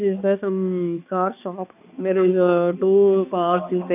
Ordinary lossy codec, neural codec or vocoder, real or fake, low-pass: none; codec, 16 kHz, 2 kbps, X-Codec, HuBERT features, trained on balanced general audio; fake; 3.6 kHz